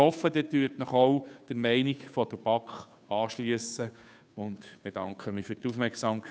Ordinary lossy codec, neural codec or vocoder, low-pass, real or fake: none; codec, 16 kHz, 2 kbps, FunCodec, trained on Chinese and English, 25 frames a second; none; fake